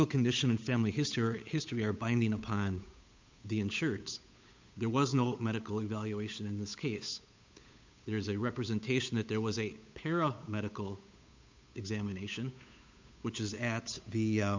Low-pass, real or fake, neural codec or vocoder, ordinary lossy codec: 7.2 kHz; fake; codec, 16 kHz, 8 kbps, FunCodec, trained on Chinese and English, 25 frames a second; MP3, 64 kbps